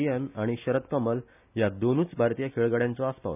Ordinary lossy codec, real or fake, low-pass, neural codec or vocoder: none; real; 3.6 kHz; none